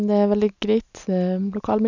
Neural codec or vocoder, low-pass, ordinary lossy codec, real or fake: none; 7.2 kHz; none; real